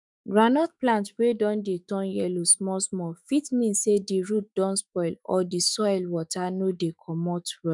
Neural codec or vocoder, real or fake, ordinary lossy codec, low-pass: autoencoder, 48 kHz, 128 numbers a frame, DAC-VAE, trained on Japanese speech; fake; none; 10.8 kHz